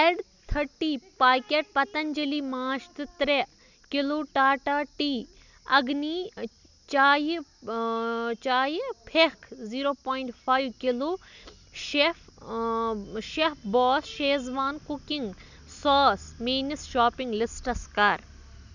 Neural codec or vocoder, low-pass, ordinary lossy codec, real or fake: none; 7.2 kHz; none; real